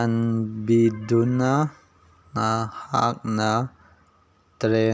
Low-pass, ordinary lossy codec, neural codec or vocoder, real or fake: none; none; none; real